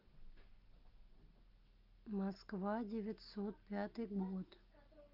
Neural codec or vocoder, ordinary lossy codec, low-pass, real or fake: none; Opus, 32 kbps; 5.4 kHz; real